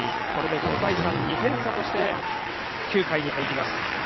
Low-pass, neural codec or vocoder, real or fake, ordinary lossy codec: 7.2 kHz; vocoder, 22.05 kHz, 80 mel bands, WaveNeXt; fake; MP3, 24 kbps